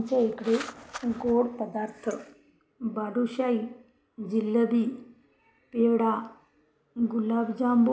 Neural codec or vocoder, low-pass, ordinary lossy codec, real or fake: none; none; none; real